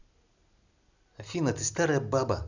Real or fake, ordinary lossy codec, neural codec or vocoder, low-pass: real; none; none; 7.2 kHz